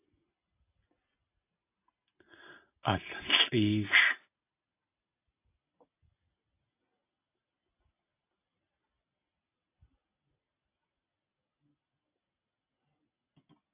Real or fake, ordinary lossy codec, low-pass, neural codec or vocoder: real; AAC, 24 kbps; 3.6 kHz; none